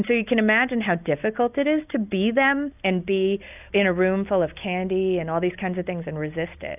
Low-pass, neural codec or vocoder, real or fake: 3.6 kHz; none; real